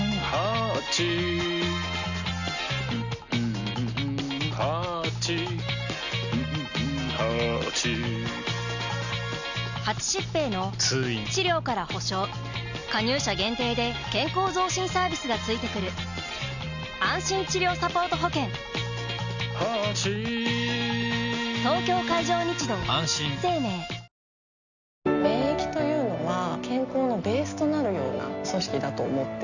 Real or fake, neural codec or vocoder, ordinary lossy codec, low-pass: real; none; none; 7.2 kHz